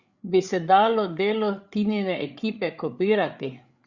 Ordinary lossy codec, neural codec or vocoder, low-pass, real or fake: Opus, 64 kbps; codec, 16 kHz, 8 kbps, FreqCodec, larger model; 7.2 kHz; fake